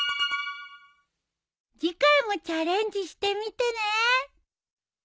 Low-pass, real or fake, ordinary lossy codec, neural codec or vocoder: none; real; none; none